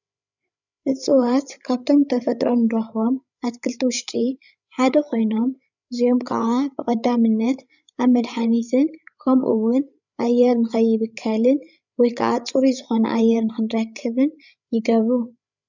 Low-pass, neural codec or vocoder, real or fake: 7.2 kHz; codec, 16 kHz, 8 kbps, FreqCodec, larger model; fake